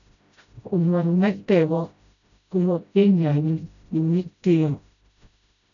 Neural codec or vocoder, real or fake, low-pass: codec, 16 kHz, 0.5 kbps, FreqCodec, smaller model; fake; 7.2 kHz